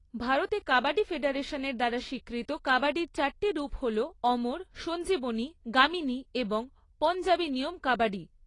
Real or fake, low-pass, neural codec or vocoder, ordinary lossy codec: real; 10.8 kHz; none; AAC, 32 kbps